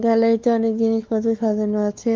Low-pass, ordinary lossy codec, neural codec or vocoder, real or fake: 7.2 kHz; Opus, 32 kbps; codec, 16 kHz, 4 kbps, X-Codec, WavLM features, trained on Multilingual LibriSpeech; fake